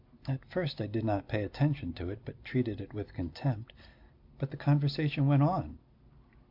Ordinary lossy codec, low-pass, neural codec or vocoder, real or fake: AAC, 48 kbps; 5.4 kHz; none; real